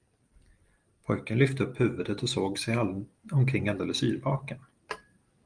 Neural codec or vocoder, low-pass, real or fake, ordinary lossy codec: none; 9.9 kHz; real; Opus, 32 kbps